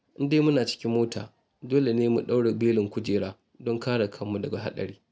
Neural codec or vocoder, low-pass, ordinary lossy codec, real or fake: none; none; none; real